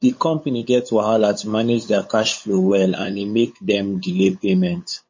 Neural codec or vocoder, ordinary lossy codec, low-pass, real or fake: codec, 16 kHz, 8 kbps, FunCodec, trained on LibriTTS, 25 frames a second; MP3, 32 kbps; 7.2 kHz; fake